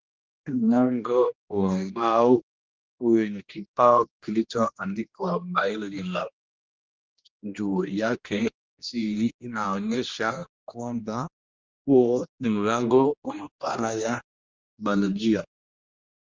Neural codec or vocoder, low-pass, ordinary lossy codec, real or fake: codec, 16 kHz, 1 kbps, X-Codec, HuBERT features, trained on general audio; 7.2 kHz; Opus, 32 kbps; fake